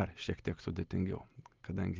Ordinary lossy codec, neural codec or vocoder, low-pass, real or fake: Opus, 32 kbps; none; 7.2 kHz; real